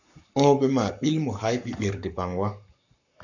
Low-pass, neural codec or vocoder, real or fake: 7.2 kHz; codec, 44.1 kHz, 7.8 kbps, Pupu-Codec; fake